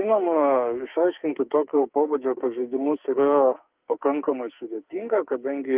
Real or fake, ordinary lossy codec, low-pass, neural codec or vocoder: fake; Opus, 32 kbps; 3.6 kHz; codec, 44.1 kHz, 2.6 kbps, SNAC